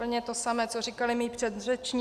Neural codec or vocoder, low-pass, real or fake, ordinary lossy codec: none; 14.4 kHz; real; Opus, 64 kbps